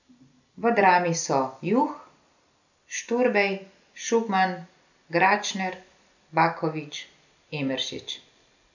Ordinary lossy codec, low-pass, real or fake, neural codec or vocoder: none; 7.2 kHz; real; none